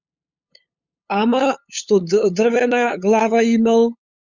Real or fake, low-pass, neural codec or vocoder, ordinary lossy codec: fake; 7.2 kHz; codec, 16 kHz, 8 kbps, FunCodec, trained on LibriTTS, 25 frames a second; Opus, 64 kbps